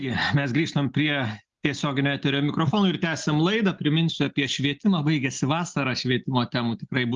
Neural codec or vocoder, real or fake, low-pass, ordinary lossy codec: none; real; 7.2 kHz; Opus, 32 kbps